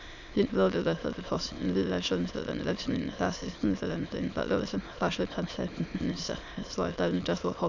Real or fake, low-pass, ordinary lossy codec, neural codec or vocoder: fake; 7.2 kHz; none; autoencoder, 22.05 kHz, a latent of 192 numbers a frame, VITS, trained on many speakers